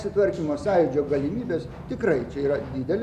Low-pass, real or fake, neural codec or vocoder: 14.4 kHz; real; none